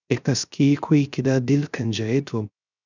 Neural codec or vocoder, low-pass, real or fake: codec, 16 kHz, 0.3 kbps, FocalCodec; 7.2 kHz; fake